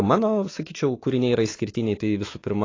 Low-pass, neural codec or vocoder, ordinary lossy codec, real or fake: 7.2 kHz; autoencoder, 48 kHz, 128 numbers a frame, DAC-VAE, trained on Japanese speech; AAC, 32 kbps; fake